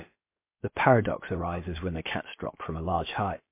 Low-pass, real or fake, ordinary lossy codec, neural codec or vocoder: 3.6 kHz; fake; MP3, 24 kbps; codec, 16 kHz, about 1 kbps, DyCAST, with the encoder's durations